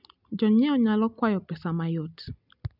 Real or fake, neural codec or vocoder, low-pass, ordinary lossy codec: real; none; 5.4 kHz; none